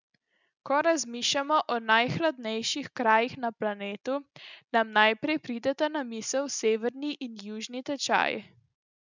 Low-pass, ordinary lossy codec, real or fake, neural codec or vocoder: 7.2 kHz; none; real; none